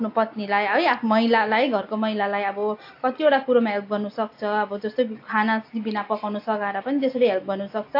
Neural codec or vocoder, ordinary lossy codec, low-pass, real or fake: none; AAC, 32 kbps; 5.4 kHz; real